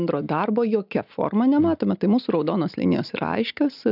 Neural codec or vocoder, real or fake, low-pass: none; real; 5.4 kHz